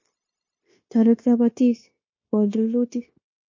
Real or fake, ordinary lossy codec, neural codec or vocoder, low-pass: fake; MP3, 32 kbps; codec, 16 kHz, 0.9 kbps, LongCat-Audio-Codec; 7.2 kHz